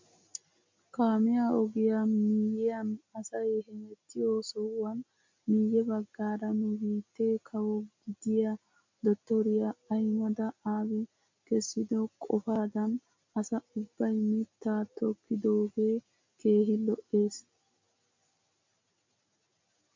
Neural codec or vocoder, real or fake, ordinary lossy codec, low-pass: none; real; MP3, 64 kbps; 7.2 kHz